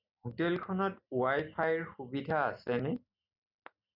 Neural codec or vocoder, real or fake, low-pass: none; real; 5.4 kHz